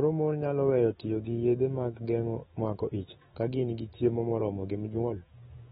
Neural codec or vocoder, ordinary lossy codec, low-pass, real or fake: autoencoder, 48 kHz, 128 numbers a frame, DAC-VAE, trained on Japanese speech; AAC, 16 kbps; 19.8 kHz; fake